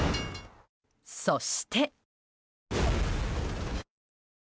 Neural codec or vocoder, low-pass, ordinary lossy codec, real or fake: none; none; none; real